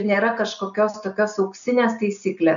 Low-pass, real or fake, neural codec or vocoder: 7.2 kHz; real; none